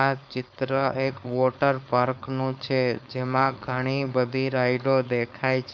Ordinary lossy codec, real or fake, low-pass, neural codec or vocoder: none; fake; none; codec, 16 kHz, 4.8 kbps, FACodec